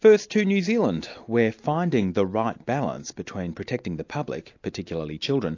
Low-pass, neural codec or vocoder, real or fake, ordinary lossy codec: 7.2 kHz; none; real; AAC, 48 kbps